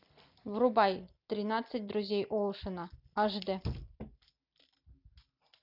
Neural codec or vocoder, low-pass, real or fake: none; 5.4 kHz; real